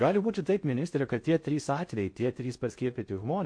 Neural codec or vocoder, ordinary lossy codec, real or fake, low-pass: codec, 16 kHz in and 24 kHz out, 0.6 kbps, FocalCodec, streaming, 4096 codes; MP3, 48 kbps; fake; 9.9 kHz